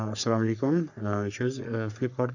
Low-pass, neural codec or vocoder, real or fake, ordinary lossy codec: 7.2 kHz; codec, 44.1 kHz, 3.4 kbps, Pupu-Codec; fake; none